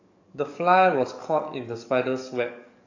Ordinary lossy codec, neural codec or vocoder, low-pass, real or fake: AAC, 48 kbps; codec, 44.1 kHz, 7.8 kbps, DAC; 7.2 kHz; fake